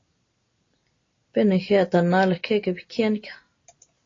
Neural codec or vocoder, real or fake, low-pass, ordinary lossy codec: none; real; 7.2 kHz; AAC, 32 kbps